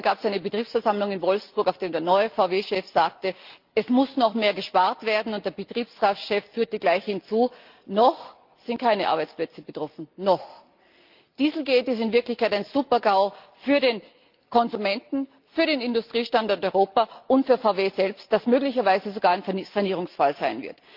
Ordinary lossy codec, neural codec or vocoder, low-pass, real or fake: Opus, 24 kbps; none; 5.4 kHz; real